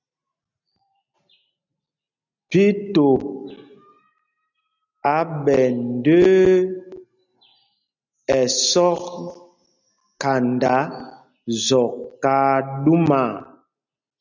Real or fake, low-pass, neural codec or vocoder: real; 7.2 kHz; none